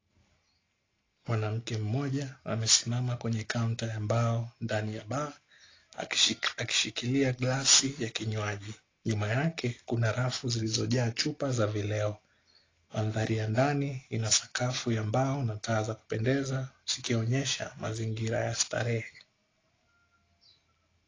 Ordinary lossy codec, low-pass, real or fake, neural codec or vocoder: AAC, 32 kbps; 7.2 kHz; real; none